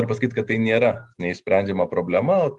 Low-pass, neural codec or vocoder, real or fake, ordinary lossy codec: 10.8 kHz; none; real; Opus, 24 kbps